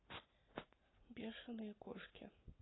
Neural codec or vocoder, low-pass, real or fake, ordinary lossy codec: none; 7.2 kHz; real; AAC, 16 kbps